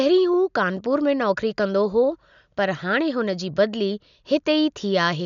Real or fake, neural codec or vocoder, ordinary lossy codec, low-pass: real; none; none; 7.2 kHz